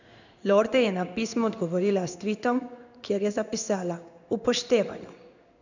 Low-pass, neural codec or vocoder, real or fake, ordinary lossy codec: 7.2 kHz; codec, 16 kHz in and 24 kHz out, 1 kbps, XY-Tokenizer; fake; none